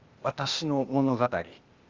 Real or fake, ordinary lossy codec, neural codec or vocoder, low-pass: fake; Opus, 32 kbps; codec, 16 kHz, 0.8 kbps, ZipCodec; 7.2 kHz